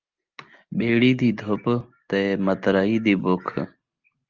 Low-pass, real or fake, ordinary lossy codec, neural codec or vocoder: 7.2 kHz; real; Opus, 24 kbps; none